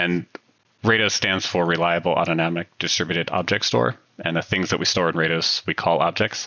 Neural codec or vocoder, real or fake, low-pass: none; real; 7.2 kHz